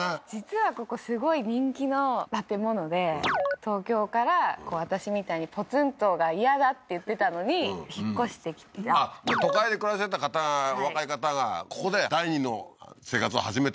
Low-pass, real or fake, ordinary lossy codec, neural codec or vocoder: none; real; none; none